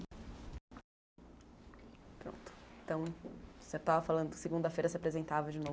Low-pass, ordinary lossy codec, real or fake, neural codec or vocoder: none; none; real; none